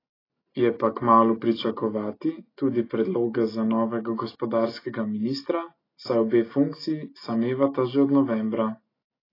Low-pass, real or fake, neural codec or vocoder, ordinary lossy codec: 5.4 kHz; real; none; AAC, 24 kbps